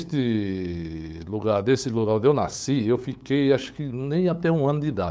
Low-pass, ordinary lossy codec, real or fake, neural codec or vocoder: none; none; fake; codec, 16 kHz, 8 kbps, FunCodec, trained on LibriTTS, 25 frames a second